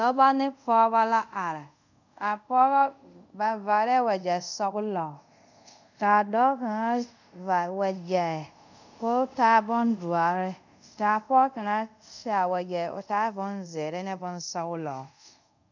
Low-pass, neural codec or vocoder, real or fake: 7.2 kHz; codec, 24 kHz, 0.5 kbps, DualCodec; fake